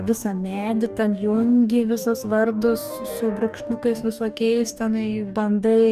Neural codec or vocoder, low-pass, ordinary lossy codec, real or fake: codec, 44.1 kHz, 2.6 kbps, DAC; 14.4 kHz; Opus, 64 kbps; fake